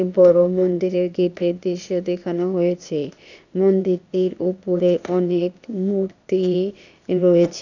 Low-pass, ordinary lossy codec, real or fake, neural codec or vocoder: 7.2 kHz; none; fake; codec, 16 kHz, 0.8 kbps, ZipCodec